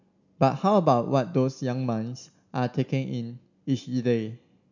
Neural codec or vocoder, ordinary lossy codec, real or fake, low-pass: none; none; real; 7.2 kHz